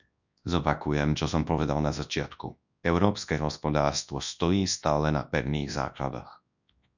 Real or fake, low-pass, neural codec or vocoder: fake; 7.2 kHz; codec, 24 kHz, 0.9 kbps, WavTokenizer, large speech release